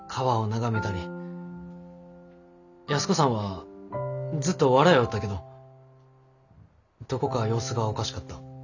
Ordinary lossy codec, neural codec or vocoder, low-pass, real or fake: none; none; 7.2 kHz; real